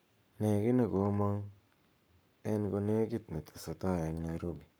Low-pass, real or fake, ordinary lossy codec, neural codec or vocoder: none; fake; none; codec, 44.1 kHz, 7.8 kbps, Pupu-Codec